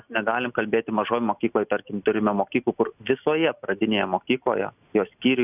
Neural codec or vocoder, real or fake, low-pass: none; real; 3.6 kHz